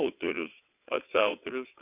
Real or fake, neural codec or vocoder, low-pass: fake; vocoder, 22.05 kHz, 80 mel bands, WaveNeXt; 3.6 kHz